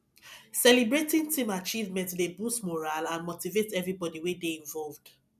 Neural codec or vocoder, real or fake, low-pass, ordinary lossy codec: none; real; 14.4 kHz; none